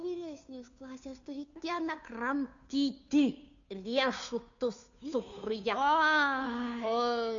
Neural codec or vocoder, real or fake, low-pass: codec, 16 kHz, 2 kbps, FunCodec, trained on Chinese and English, 25 frames a second; fake; 7.2 kHz